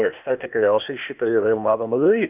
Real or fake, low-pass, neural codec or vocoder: fake; 3.6 kHz; codec, 16 kHz, 0.8 kbps, ZipCodec